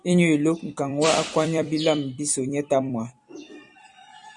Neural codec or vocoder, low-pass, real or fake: vocoder, 44.1 kHz, 128 mel bands every 256 samples, BigVGAN v2; 10.8 kHz; fake